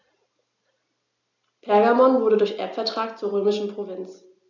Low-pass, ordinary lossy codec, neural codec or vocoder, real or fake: 7.2 kHz; none; none; real